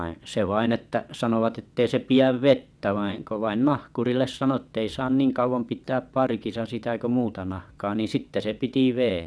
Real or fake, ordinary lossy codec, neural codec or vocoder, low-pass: fake; none; vocoder, 22.05 kHz, 80 mel bands, WaveNeXt; none